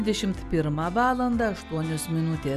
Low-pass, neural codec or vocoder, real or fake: 14.4 kHz; none; real